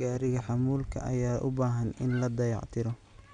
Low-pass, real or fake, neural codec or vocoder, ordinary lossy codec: 9.9 kHz; real; none; none